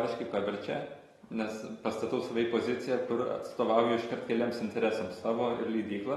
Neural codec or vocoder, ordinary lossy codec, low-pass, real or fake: none; AAC, 32 kbps; 19.8 kHz; real